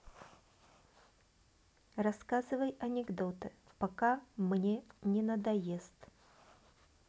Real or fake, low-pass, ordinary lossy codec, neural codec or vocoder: real; none; none; none